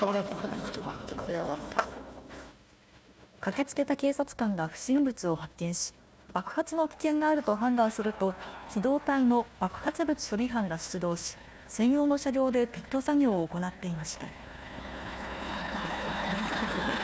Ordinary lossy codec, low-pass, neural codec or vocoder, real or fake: none; none; codec, 16 kHz, 1 kbps, FunCodec, trained on Chinese and English, 50 frames a second; fake